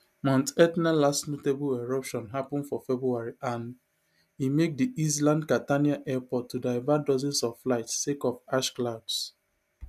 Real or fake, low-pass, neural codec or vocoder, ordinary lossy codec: real; 14.4 kHz; none; none